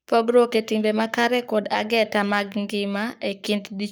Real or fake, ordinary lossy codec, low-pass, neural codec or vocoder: fake; none; none; codec, 44.1 kHz, 7.8 kbps, Pupu-Codec